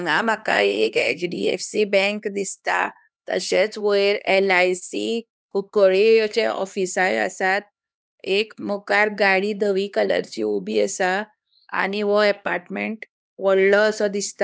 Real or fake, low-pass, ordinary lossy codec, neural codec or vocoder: fake; none; none; codec, 16 kHz, 2 kbps, X-Codec, HuBERT features, trained on LibriSpeech